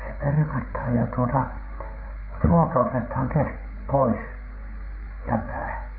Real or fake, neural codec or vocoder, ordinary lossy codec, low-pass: fake; codec, 16 kHz, 8 kbps, FreqCodec, larger model; none; 5.4 kHz